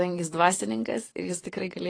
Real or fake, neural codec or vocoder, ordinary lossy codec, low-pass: fake; vocoder, 22.05 kHz, 80 mel bands, WaveNeXt; AAC, 32 kbps; 9.9 kHz